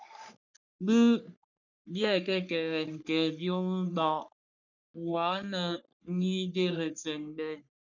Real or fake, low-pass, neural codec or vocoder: fake; 7.2 kHz; codec, 44.1 kHz, 3.4 kbps, Pupu-Codec